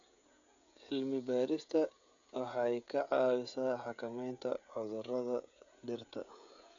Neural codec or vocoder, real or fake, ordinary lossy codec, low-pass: codec, 16 kHz, 16 kbps, FreqCodec, smaller model; fake; none; 7.2 kHz